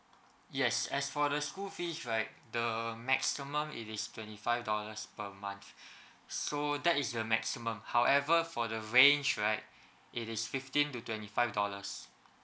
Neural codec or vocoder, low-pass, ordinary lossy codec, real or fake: none; none; none; real